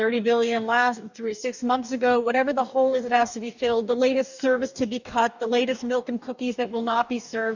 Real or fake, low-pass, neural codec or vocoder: fake; 7.2 kHz; codec, 44.1 kHz, 2.6 kbps, DAC